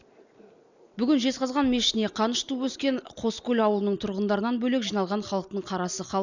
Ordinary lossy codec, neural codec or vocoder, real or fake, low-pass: none; none; real; 7.2 kHz